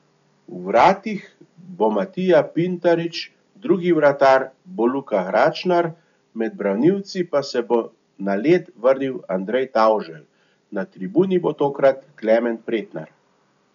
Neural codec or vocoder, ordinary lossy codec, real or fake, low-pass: none; none; real; 7.2 kHz